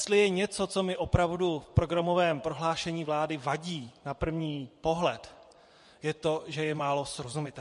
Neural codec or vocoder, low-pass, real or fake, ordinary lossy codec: vocoder, 24 kHz, 100 mel bands, Vocos; 10.8 kHz; fake; MP3, 48 kbps